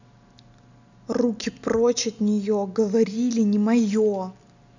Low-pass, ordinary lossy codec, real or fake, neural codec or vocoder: 7.2 kHz; none; real; none